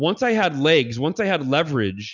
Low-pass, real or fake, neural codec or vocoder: 7.2 kHz; real; none